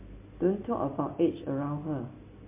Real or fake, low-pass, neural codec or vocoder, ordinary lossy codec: real; 3.6 kHz; none; MP3, 24 kbps